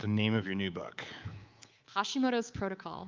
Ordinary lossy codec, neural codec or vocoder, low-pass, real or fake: Opus, 24 kbps; codec, 24 kHz, 3.1 kbps, DualCodec; 7.2 kHz; fake